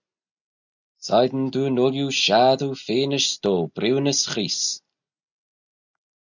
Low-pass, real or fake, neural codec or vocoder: 7.2 kHz; real; none